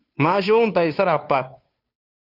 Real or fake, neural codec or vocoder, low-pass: fake; codec, 16 kHz, 2 kbps, FunCodec, trained on Chinese and English, 25 frames a second; 5.4 kHz